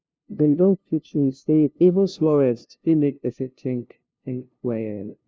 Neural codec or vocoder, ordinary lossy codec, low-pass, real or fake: codec, 16 kHz, 0.5 kbps, FunCodec, trained on LibriTTS, 25 frames a second; none; none; fake